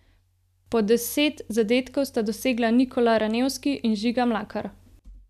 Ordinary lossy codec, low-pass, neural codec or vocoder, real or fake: none; 14.4 kHz; none; real